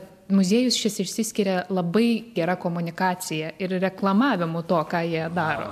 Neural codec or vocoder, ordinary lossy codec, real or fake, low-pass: none; AAC, 96 kbps; real; 14.4 kHz